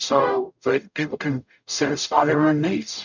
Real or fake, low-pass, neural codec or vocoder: fake; 7.2 kHz; codec, 44.1 kHz, 0.9 kbps, DAC